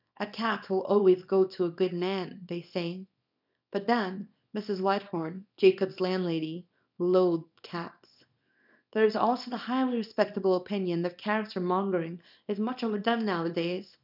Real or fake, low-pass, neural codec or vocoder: fake; 5.4 kHz; codec, 24 kHz, 0.9 kbps, WavTokenizer, small release